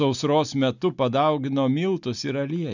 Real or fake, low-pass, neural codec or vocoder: real; 7.2 kHz; none